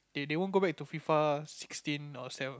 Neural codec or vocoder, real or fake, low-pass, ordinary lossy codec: none; real; none; none